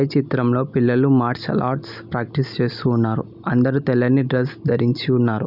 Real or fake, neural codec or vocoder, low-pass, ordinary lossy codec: real; none; 5.4 kHz; none